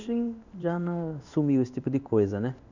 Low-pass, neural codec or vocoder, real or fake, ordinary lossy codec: 7.2 kHz; codec, 16 kHz in and 24 kHz out, 1 kbps, XY-Tokenizer; fake; none